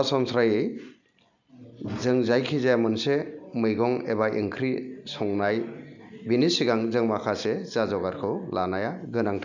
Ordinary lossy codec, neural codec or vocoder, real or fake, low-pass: none; none; real; 7.2 kHz